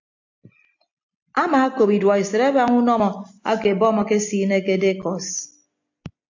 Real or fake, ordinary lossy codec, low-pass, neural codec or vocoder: real; AAC, 48 kbps; 7.2 kHz; none